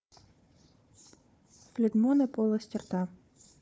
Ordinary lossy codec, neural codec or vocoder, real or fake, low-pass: none; codec, 16 kHz, 4 kbps, FunCodec, trained on Chinese and English, 50 frames a second; fake; none